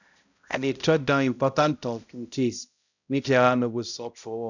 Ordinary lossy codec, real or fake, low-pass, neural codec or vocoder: none; fake; 7.2 kHz; codec, 16 kHz, 0.5 kbps, X-Codec, HuBERT features, trained on balanced general audio